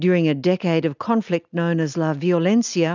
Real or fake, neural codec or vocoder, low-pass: real; none; 7.2 kHz